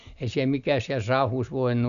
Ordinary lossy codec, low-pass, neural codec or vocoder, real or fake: none; 7.2 kHz; none; real